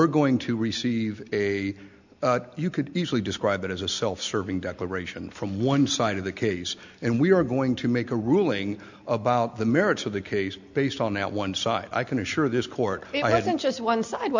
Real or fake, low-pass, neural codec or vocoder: real; 7.2 kHz; none